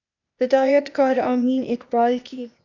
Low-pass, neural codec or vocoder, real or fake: 7.2 kHz; codec, 16 kHz, 0.8 kbps, ZipCodec; fake